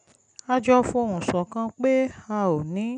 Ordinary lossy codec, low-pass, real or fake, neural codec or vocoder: none; 9.9 kHz; real; none